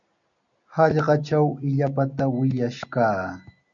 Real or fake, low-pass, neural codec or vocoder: real; 7.2 kHz; none